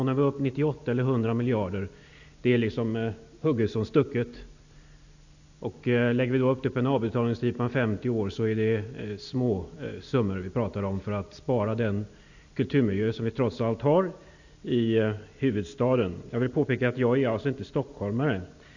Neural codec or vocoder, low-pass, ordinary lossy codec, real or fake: none; 7.2 kHz; none; real